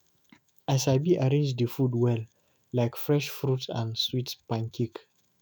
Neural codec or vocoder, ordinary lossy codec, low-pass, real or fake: autoencoder, 48 kHz, 128 numbers a frame, DAC-VAE, trained on Japanese speech; none; none; fake